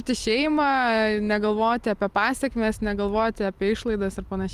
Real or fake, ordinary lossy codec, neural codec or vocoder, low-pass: fake; Opus, 32 kbps; vocoder, 44.1 kHz, 128 mel bands every 256 samples, BigVGAN v2; 14.4 kHz